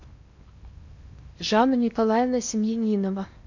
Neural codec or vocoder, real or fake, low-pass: codec, 16 kHz in and 24 kHz out, 0.8 kbps, FocalCodec, streaming, 65536 codes; fake; 7.2 kHz